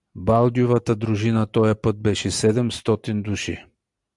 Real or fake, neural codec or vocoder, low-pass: real; none; 10.8 kHz